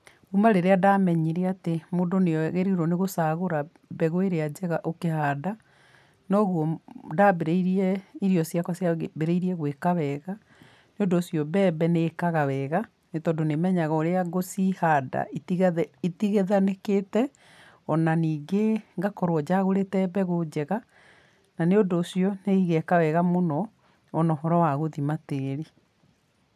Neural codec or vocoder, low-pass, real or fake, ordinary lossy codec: none; 14.4 kHz; real; none